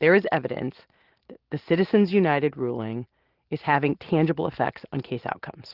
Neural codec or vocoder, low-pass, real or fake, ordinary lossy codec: none; 5.4 kHz; real; Opus, 16 kbps